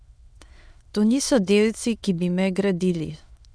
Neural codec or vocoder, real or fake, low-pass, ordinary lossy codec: autoencoder, 22.05 kHz, a latent of 192 numbers a frame, VITS, trained on many speakers; fake; none; none